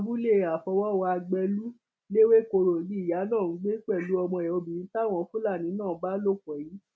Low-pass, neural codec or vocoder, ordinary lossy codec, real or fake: none; none; none; real